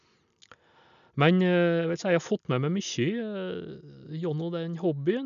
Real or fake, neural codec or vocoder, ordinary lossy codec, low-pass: real; none; none; 7.2 kHz